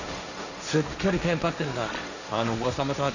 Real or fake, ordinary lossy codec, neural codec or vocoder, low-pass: fake; none; codec, 16 kHz, 1.1 kbps, Voila-Tokenizer; 7.2 kHz